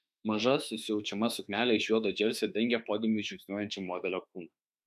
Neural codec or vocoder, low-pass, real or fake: autoencoder, 48 kHz, 32 numbers a frame, DAC-VAE, trained on Japanese speech; 14.4 kHz; fake